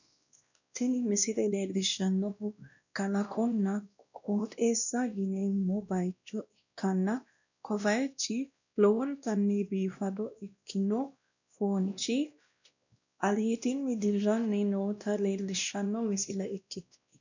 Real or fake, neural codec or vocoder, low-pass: fake; codec, 16 kHz, 1 kbps, X-Codec, WavLM features, trained on Multilingual LibriSpeech; 7.2 kHz